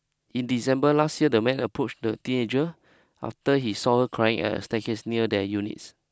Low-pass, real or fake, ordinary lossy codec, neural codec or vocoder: none; real; none; none